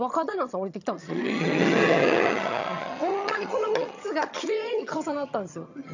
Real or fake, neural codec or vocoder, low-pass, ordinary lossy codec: fake; vocoder, 22.05 kHz, 80 mel bands, HiFi-GAN; 7.2 kHz; none